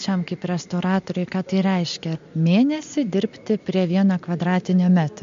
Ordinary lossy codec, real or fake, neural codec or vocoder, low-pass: MP3, 48 kbps; real; none; 7.2 kHz